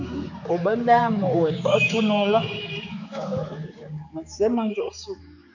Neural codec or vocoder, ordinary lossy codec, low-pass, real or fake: codec, 16 kHz, 4 kbps, X-Codec, HuBERT features, trained on general audio; AAC, 48 kbps; 7.2 kHz; fake